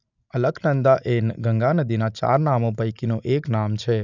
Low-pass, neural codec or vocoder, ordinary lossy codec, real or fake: 7.2 kHz; none; none; real